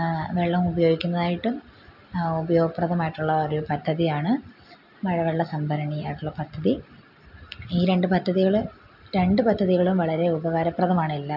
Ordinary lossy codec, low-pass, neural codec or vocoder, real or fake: none; 5.4 kHz; none; real